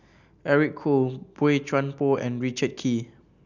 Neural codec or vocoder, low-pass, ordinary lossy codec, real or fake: none; 7.2 kHz; none; real